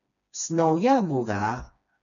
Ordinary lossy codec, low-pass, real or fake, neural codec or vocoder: MP3, 96 kbps; 7.2 kHz; fake; codec, 16 kHz, 2 kbps, FreqCodec, smaller model